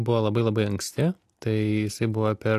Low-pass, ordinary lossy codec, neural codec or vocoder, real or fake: 14.4 kHz; AAC, 64 kbps; none; real